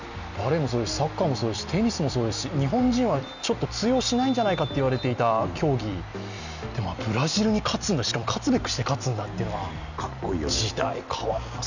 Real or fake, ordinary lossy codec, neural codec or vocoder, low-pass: real; none; none; 7.2 kHz